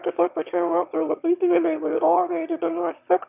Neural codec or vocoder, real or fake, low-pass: autoencoder, 22.05 kHz, a latent of 192 numbers a frame, VITS, trained on one speaker; fake; 3.6 kHz